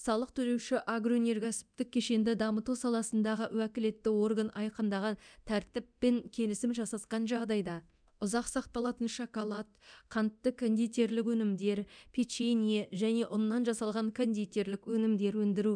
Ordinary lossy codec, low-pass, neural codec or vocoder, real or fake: none; 9.9 kHz; codec, 24 kHz, 0.9 kbps, DualCodec; fake